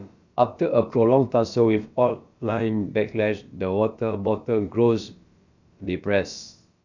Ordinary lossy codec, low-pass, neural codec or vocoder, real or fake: Opus, 64 kbps; 7.2 kHz; codec, 16 kHz, about 1 kbps, DyCAST, with the encoder's durations; fake